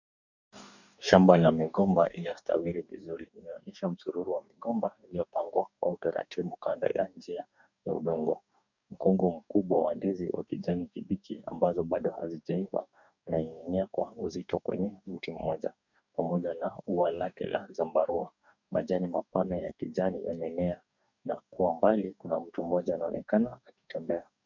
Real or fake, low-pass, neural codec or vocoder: fake; 7.2 kHz; codec, 44.1 kHz, 2.6 kbps, DAC